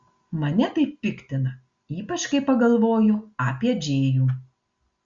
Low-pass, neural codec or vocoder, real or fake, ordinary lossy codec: 7.2 kHz; none; real; Opus, 64 kbps